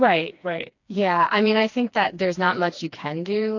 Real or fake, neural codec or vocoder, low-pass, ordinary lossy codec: fake; codec, 16 kHz, 2 kbps, FreqCodec, smaller model; 7.2 kHz; AAC, 48 kbps